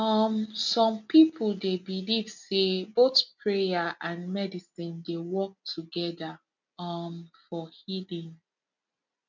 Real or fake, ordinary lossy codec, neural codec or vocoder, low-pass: real; none; none; 7.2 kHz